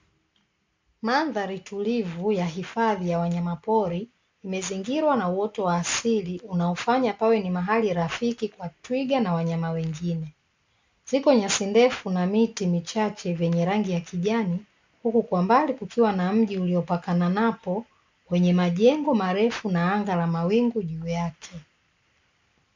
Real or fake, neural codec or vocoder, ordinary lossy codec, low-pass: real; none; MP3, 64 kbps; 7.2 kHz